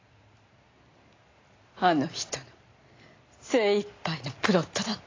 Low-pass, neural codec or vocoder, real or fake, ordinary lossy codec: 7.2 kHz; none; real; AAC, 32 kbps